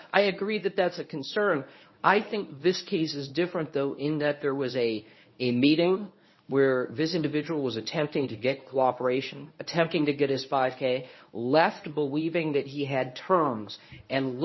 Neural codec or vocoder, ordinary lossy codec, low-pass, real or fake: codec, 24 kHz, 0.9 kbps, WavTokenizer, medium speech release version 1; MP3, 24 kbps; 7.2 kHz; fake